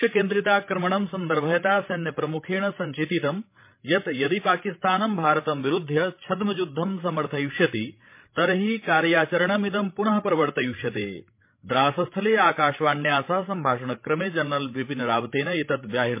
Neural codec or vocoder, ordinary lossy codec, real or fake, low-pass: codec, 16 kHz, 16 kbps, FreqCodec, larger model; MP3, 24 kbps; fake; 3.6 kHz